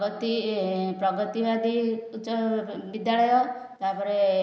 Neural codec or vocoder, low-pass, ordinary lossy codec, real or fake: none; none; none; real